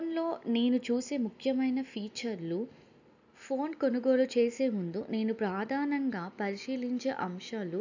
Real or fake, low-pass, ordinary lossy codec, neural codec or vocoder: real; 7.2 kHz; none; none